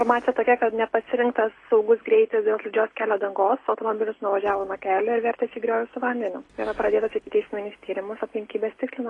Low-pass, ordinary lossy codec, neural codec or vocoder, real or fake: 10.8 kHz; AAC, 32 kbps; none; real